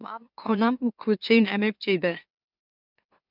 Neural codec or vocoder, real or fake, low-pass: autoencoder, 44.1 kHz, a latent of 192 numbers a frame, MeloTTS; fake; 5.4 kHz